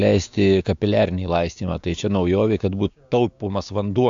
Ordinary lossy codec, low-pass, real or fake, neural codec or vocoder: AAC, 64 kbps; 7.2 kHz; fake; codec, 16 kHz, 6 kbps, DAC